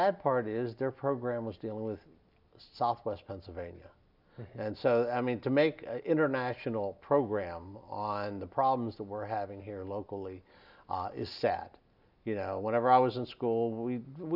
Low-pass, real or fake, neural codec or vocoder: 5.4 kHz; real; none